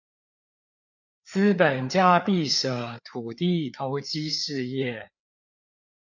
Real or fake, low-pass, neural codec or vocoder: fake; 7.2 kHz; codec, 16 kHz, 4 kbps, FreqCodec, larger model